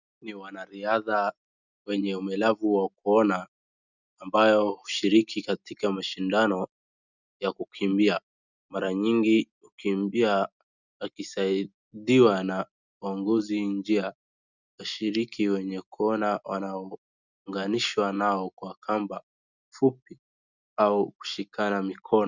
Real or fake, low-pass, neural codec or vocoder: real; 7.2 kHz; none